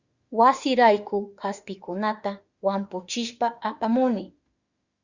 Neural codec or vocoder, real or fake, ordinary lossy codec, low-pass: autoencoder, 48 kHz, 32 numbers a frame, DAC-VAE, trained on Japanese speech; fake; Opus, 64 kbps; 7.2 kHz